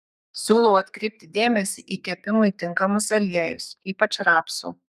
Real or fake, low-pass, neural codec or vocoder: fake; 14.4 kHz; codec, 32 kHz, 1.9 kbps, SNAC